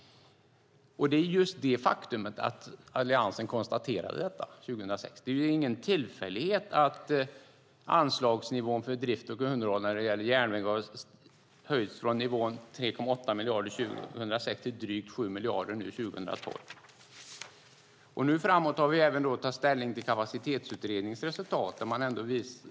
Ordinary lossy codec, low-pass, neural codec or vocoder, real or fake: none; none; none; real